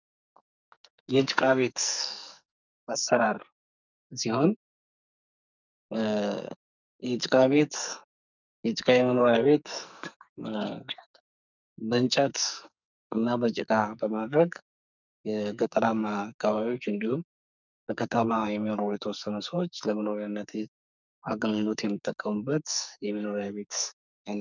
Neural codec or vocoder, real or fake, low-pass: codec, 44.1 kHz, 2.6 kbps, SNAC; fake; 7.2 kHz